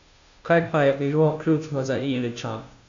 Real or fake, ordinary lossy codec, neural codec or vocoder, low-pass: fake; AAC, 48 kbps; codec, 16 kHz, 0.5 kbps, FunCodec, trained on Chinese and English, 25 frames a second; 7.2 kHz